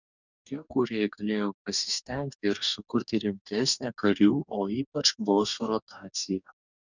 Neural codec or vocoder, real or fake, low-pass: codec, 44.1 kHz, 2.6 kbps, DAC; fake; 7.2 kHz